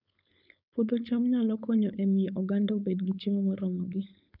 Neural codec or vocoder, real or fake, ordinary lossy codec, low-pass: codec, 16 kHz, 4.8 kbps, FACodec; fake; none; 5.4 kHz